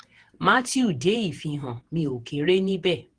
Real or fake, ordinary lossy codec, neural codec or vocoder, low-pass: fake; Opus, 16 kbps; vocoder, 48 kHz, 128 mel bands, Vocos; 9.9 kHz